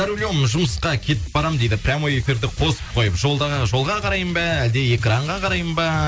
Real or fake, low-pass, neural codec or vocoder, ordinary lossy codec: real; none; none; none